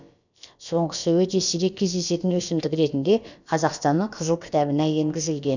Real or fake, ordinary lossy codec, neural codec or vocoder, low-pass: fake; none; codec, 16 kHz, about 1 kbps, DyCAST, with the encoder's durations; 7.2 kHz